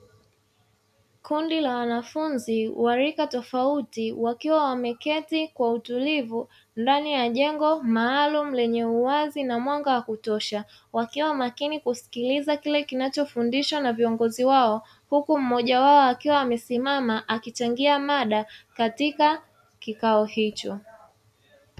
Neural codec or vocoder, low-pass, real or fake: none; 14.4 kHz; real